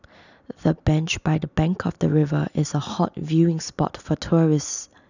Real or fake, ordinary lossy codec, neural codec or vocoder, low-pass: real; none; none; 7.2 kHz